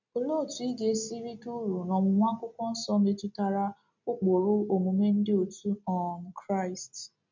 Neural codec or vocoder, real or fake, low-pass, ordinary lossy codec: none; real; 7.2 kHz; MP3, 64 kbps